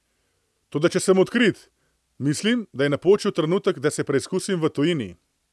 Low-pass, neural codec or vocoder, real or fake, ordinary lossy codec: none; none; real; none